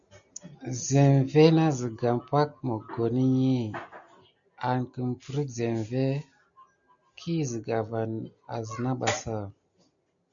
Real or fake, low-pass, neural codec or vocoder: real; 7.2 kHz; none